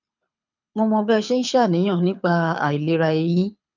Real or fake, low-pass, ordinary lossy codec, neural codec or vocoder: fake; 7.2 kHz; none; codec, 24 kHz, 6 kbps, HILCodec